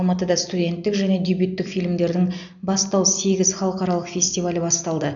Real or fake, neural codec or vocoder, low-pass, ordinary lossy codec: real; none; 7.2 kHz; none